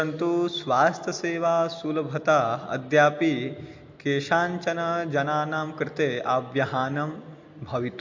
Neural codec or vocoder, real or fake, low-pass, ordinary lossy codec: none; real; 7.2 kHz; MP3, 48 kbps